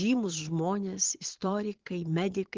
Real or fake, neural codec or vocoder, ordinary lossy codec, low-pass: fake; vocoder, 22.05 kHz, 80 mel bands, Vocos; Opus, 16 kbps; 7.2 kHz